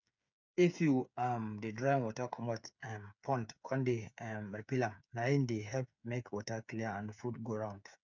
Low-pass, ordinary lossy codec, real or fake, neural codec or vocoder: 7.2 kHz; none; fake; codec, 16 kHz, 8 kbps, FreqCodec, smaller model